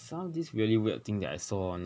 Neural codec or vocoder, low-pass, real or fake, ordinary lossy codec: none; none; real; none